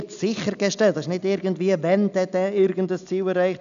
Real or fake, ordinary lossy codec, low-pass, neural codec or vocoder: real; none; 7.2 kHz; none